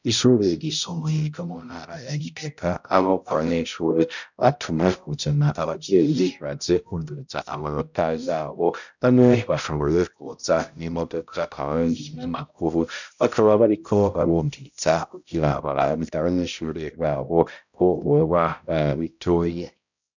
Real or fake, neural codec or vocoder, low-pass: fake; codec, 16 kHz, 0.5 kbps, X-Codec, HuBERT features, trained on balanced general audio; 7.2 kHz